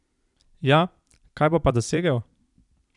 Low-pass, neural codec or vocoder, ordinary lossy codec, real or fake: 10.8 kHz; vocoder, 44.1 kHz, 128 mel bands every 256 samples, BigVGAN v2; none; fake